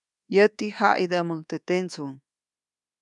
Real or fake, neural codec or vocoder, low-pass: fake; codec, 24 kHz, 1.2 kbps, DualCodec; 10.8 kHz